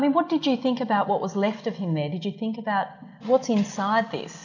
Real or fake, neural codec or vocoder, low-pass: real; none; 7.2 kHz